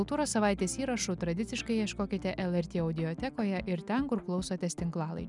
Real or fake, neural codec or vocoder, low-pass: real; none; 10.8 kHz